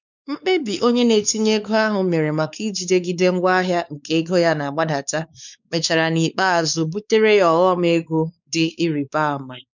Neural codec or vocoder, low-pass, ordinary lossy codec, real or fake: codec, 16 kHz, 4 kbps, X-Codec, WavLM features, trained on Multilingual LibriSpeech; 7.2 kHz; none; fake